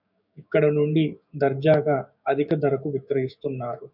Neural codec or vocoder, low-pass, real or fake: codec, 16 kHz, 6 kbps, DAC; 5.4 kHz; fake